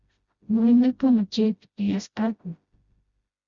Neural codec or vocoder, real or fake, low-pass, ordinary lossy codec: codec, 16 kHz, 0.5 kbps, FreqCodec, smaller model; fake; 7.2 kHz; Opus, 64 kbps